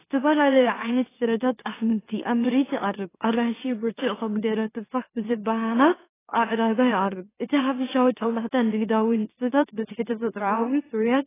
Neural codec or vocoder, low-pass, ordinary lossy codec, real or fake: autoencoder, 44.1 kHz, a latent of 192 numbers a frame, MeloTTS; 3.6 kHz; AAC, 16 kbps; fake